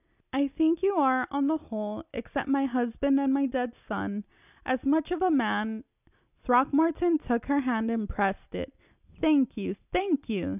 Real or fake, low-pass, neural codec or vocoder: real; 3.6 kHz; none